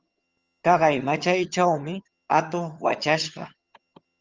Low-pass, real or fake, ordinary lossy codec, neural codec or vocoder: 7.2 kHz; fake; Opus, 24 kbps; vocoder, 22.05 kHz, 80 mel bands, HiFi-GAN